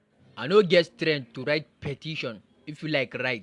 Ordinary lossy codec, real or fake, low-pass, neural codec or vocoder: AAC, 64 kbps; real; 10.8 kHz; none